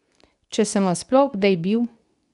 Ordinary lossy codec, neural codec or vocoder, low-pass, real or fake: none; codec, 24 kHz, 0.9 kbps, WavTokenizer, medium speech release version 2; 10.8 kHz; fake